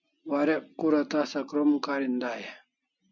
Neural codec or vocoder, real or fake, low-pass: none; real; 7.2 kHz